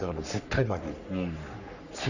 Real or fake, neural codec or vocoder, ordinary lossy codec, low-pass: fake; codec, 44.1 kHz, 3.4 kbps, Pupu-Codec; none; 7.2 kHz